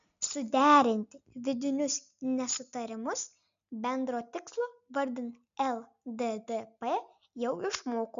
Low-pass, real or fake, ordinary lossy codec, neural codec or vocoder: 7.2 kHz; real; MP3, 96 kbps; none